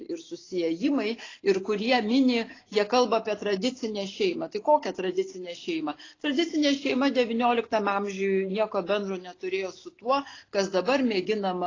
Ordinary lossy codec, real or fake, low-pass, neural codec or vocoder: AAC, 32 kbps; real; 7.2 kHz; none